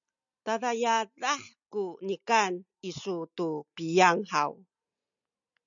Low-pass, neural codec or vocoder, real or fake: 7.2 kHz; none; real